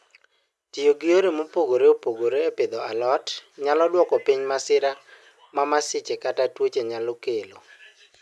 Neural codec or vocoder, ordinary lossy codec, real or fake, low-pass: none; none; real; none